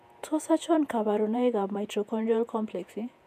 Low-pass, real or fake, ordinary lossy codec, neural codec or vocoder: 14.4 kHz; fake; MP3, 96 kbps; vocoder, 48 kHz, 128 mel bands, Vocos